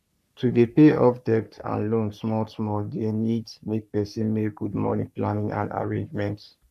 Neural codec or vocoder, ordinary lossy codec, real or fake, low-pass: codec, 44.1 kHz, 3.4 kbps, Pupu-Codec; none; fake; 14.4 kHz